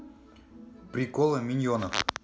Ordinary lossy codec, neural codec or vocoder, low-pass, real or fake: none; none; none; real